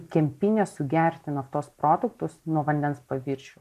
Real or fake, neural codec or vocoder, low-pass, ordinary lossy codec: real; none; 14.4 kHz; MP3, 96 kbps